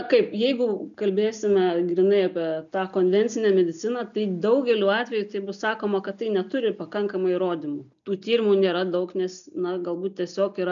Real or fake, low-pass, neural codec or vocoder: real; 7.2 kHz; none